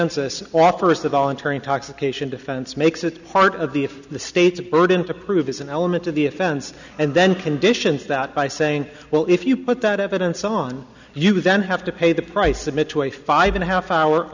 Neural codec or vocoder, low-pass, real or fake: none; 7.2 kHz; real